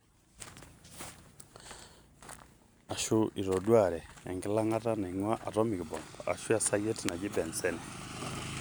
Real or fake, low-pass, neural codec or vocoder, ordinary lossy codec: fake; none; vocoder, 44.1 kHz, 128 mel bands every 512 samples, BigVGAN v2; none